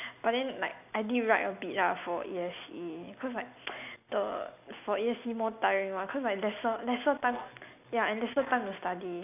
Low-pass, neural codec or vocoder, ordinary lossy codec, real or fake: 3.6 kHz; none; none; real